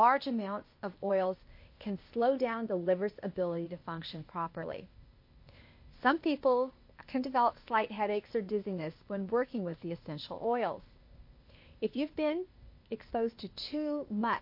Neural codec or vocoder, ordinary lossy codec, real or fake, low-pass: codec, 16 kHz, 0.8 kbps, ZipCodec; MP3, 32 kbps; fake; 5.4 kHz